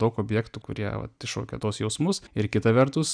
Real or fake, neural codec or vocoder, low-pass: real; none; 9.9 kHz